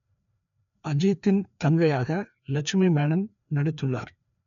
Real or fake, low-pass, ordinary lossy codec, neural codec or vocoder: fake; 7.2 kHz; none; codec, 16 kHz, 2 kbps, FreqCodec, larger model